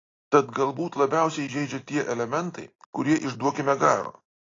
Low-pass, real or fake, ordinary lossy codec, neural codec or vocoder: 7.2 kHz; real; AAC, 32 kbps; none